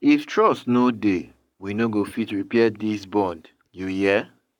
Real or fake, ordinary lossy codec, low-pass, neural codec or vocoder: fake; none; 19.8 kHz; codec, 44.1 kHz, 7.8 kbps, Pupu-Codec